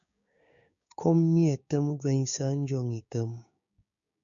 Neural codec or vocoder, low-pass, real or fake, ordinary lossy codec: codec, 16 kHz, 6 kbps, DAC; 7.2 kHz; fake; MP3, 96 kbps